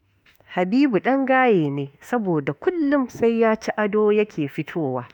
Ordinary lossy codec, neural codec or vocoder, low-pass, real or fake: none; autoencoder, 48 kHz, 32 numbers a frame, DAC-VAE, trained on Japanese speech; 19.8 kHz; fake